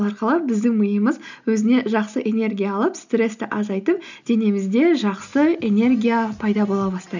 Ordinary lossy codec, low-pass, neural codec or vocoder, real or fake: none; 7.2 kHz; none; real